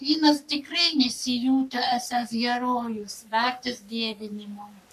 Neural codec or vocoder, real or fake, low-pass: codec, 44.1 kHz, 3.4 kbps, Pupu-Codec; fake; 14.4 kHz